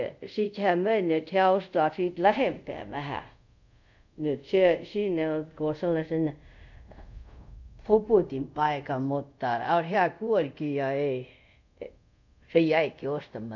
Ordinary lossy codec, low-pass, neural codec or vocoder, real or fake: none; 7.2 kHz; codec, 24 kHz, 0.5 kbps, DualCodec; fake